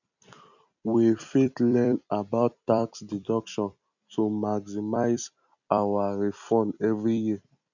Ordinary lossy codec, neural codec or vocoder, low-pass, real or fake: none; vocoder, 44.1 kHz, 128 mel bands every 256 samples, BigVGAN v2; 7.2 kHz; fake